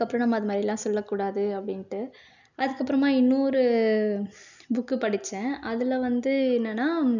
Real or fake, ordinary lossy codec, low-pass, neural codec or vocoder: real; none; 7.2 kHz; none